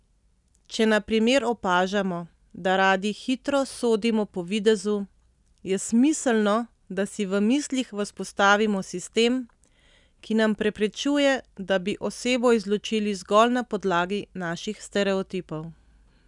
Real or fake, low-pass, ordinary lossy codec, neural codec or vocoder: real; 10.8 kHz; none; none